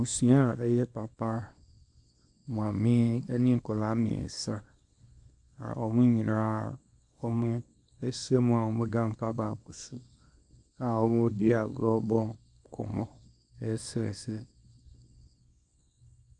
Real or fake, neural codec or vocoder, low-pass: fake; codec, 24 kHz, 0.9 kbps, WavTokenizer, small release; 10.8 kHz